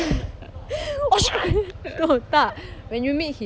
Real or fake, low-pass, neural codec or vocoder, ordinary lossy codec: real; none; none; none